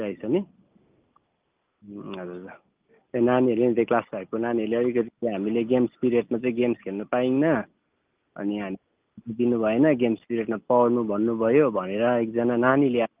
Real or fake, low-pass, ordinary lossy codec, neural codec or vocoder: real; 3.6 kHz; Opus, 24 kbps; none